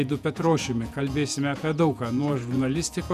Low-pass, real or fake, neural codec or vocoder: 14.4 kHz; fake; vocoder, 48 kHz, 128 mel bands, Vocos